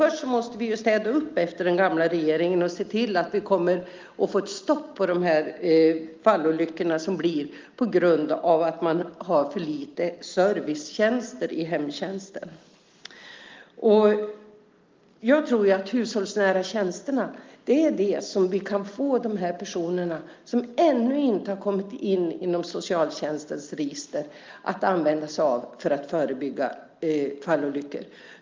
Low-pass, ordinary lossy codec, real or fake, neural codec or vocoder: 7.2 kHz; Opus, 32 kbps; real; none